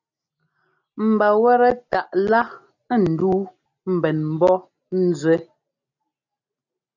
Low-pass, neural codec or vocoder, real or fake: 7.2 kHz; none; real